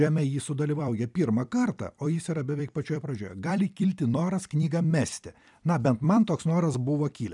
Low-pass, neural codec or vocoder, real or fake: 10.8 kHz; vocoder, 44.1 kHz, 128 mel bands every 256 samples, BigVGAN v2; fake